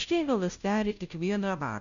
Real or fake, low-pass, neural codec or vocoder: fake; 7.2 kHz; codec, 16 kHz, 0.5 kbps, FunCodec, trained on Chinese and English, 25 frames a second